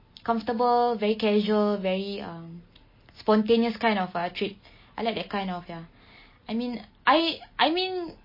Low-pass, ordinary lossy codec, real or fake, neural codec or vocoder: 5.4 kHz; MP3, 24 kbps; real; none